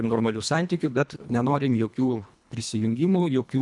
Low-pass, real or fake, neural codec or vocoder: 10.8 kHz; fake; codec, 24 kHz, 1.5 kbps, HILCodec